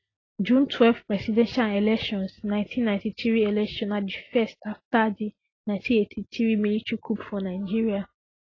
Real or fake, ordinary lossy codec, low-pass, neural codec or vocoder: real; AAC, 32 kbps; 7.2 kHz; none